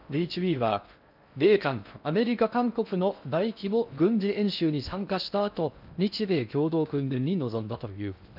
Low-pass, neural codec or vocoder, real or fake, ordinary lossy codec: 5.4 kHz; codec, 16 kHz in and 24 kHz out, 0.6 kbps, FocalCodec, streaming, 4096 codes; fake; none